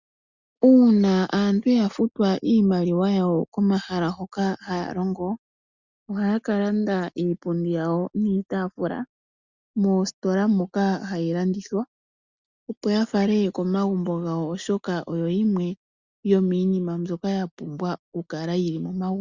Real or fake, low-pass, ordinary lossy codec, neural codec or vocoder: real; 7.2 kHz; Opus, 64 kbps; none